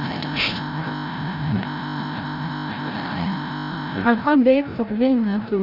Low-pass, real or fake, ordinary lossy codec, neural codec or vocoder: 5.4 kHz; fake; none; codec, 16 kHz, 0.5 kbps, FreqCodec, larger model